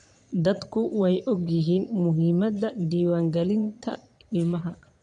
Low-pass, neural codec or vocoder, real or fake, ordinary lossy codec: 9.9 kHz; vocoder, 22.05 kHz, 80 mel bands, Vocos; fake; none